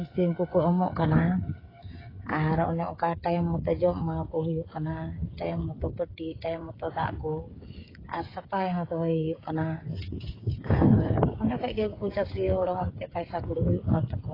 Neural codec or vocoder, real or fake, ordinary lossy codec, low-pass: codec, 16 kHz, 8 kbps, FreqCodec, smaller model; fake; AAC, 24 kbps; 5.4 kHz